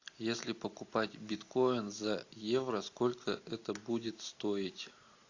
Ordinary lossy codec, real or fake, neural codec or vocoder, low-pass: AAC, 48 kbps; real; none; 7.2 kHz